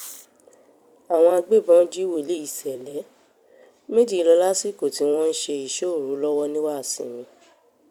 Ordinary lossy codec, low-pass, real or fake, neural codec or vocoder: none; 19.8 kHz; fake; vocoder, 44.1 kHz, 128 mel bands every 256 samples, BigVGAN v2